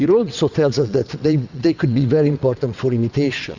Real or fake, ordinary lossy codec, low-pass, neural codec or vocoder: fake; Opus, 64 kbps; 7.2 kHz; vocoder, 22.05 kHz, 80 mel bands, WaveNeXt